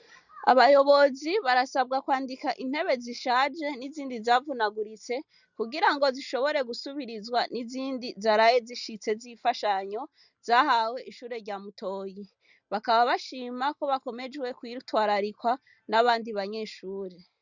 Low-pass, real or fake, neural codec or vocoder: 7.2 kHz; real; none